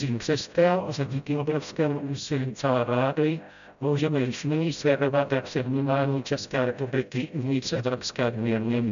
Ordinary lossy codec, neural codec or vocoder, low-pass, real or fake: MP3, 96 kbps; codec, 16 kHz, 0.5 kbps, FreqCodec, smaller model; 7.2 kHz; fake